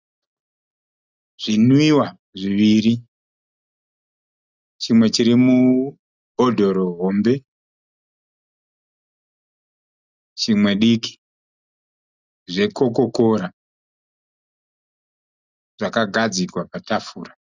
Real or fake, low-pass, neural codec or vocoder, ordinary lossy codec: real; 7.2 kHz; none; Opus, 64 kbps